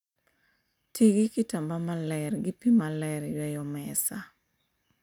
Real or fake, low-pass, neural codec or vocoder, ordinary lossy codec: real; 19.8 kHz; none; none